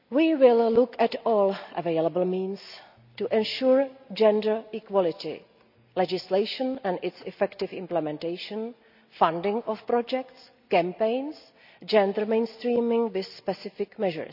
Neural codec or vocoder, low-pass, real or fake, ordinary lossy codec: none; 5.4 kHz; real; none